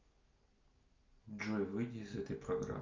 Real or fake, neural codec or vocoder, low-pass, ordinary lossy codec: real; none; 7.2 kHz; Opus, 24 kbps